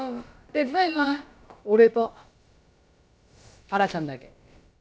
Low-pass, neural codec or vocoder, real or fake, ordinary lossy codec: none; codec, 16 kHz, about 1 kbps, DyCAST, with the encoder's durations; fake; none